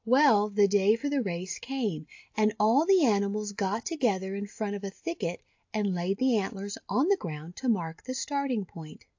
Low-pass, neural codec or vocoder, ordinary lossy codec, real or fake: 7.2 kHz; none; AAC, 48 kbps; real